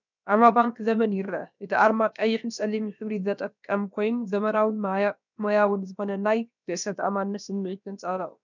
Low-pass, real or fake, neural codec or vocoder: 7.2 kHz; fake; codec, 16 kHz, about 1 kbps, DyCAST, with the encoder's durations